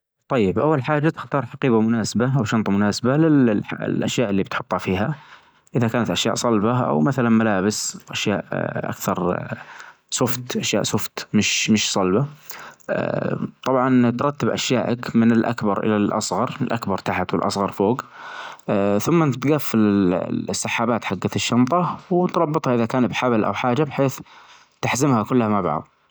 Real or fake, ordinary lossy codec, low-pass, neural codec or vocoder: real; none; none; none